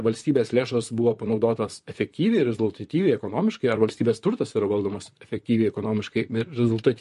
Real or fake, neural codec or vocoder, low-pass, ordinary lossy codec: fake; vocoder, 44.1 kHz, 128 mel bands, Pupu-Vocoder; 14.4 kHz; MP3, 48 kbps